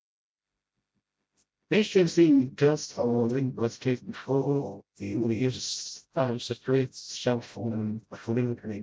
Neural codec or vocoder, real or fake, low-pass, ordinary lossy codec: codec, 16 kHz, 0.5 kbps, FreqCodec, smaller model; fake; none; none